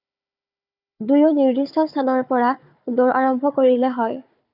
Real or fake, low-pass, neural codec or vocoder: fake; 5.4 kHz; codec, 16 kHz, 4 kbps, FunCodec, trained on Chinese and English, 50 frames a second